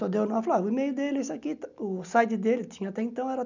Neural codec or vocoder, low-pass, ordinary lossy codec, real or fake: none; 7.2 kHz; none; real